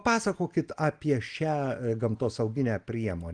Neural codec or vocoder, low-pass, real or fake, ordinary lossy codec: none; 9.9 kHz; real; Opus, 24 kbps